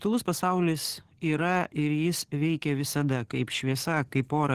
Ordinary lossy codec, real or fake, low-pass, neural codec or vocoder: Opus, 16 kbps; fake; 14.4 kHz; codec, 44.1 kHz, 7.8 kbps, DAC